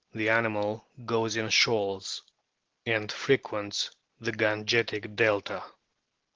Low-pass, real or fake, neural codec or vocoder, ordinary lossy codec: 7.2 kHz; real; none; Opus, 16 kbps